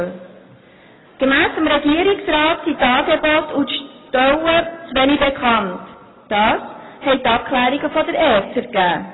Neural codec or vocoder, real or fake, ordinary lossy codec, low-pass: none; real; AAC, 16 kbps; 7.2 kHz